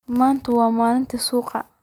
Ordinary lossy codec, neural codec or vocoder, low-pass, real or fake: none; none; 19.8 kHz; real